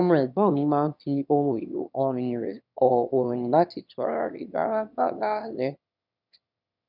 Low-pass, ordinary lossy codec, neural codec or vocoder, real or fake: 5.4 kHz; none; autoencoder, 22.05 kHz, a latent of 192 numbers a frame, VITS, trained on one speaker; fake